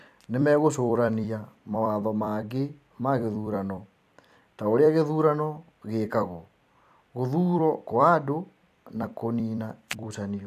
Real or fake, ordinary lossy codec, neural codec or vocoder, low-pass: fake; MP3, 96 kbps; vocoder, 44.1 kHz, 128 mel bands every 256 samples, BigVGAN v2; 14.4 kHz